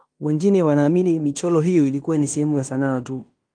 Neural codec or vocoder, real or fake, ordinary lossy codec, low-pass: codec, 16 kHz in and 24 kHz out, 0.9 kbps, LongCat-Audio-Codec, fine tuned four codebook decoder; fake; Opus, 32 kbps; 9.9 kHz